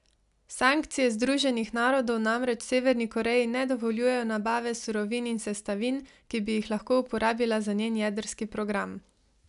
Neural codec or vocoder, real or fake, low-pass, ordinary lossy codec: none; real; 10.8 kHz; none